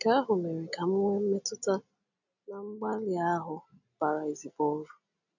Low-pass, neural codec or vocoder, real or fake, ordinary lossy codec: 7.2 kHz; none; real; none